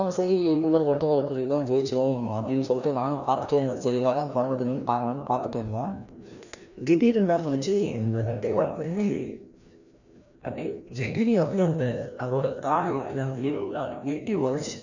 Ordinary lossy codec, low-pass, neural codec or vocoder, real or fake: none; 7.2 kHz; codec, 16 kHz, 1 kbps, FreqCodec, larger model; fake